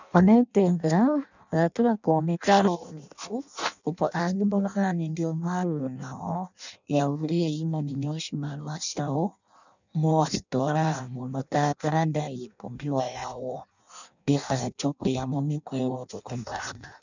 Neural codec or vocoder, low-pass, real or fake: codec, 16 kHz in and 24 kHz out, 0.6 kbps, FireRedTTS-2 codec; 7.2 kHz; fake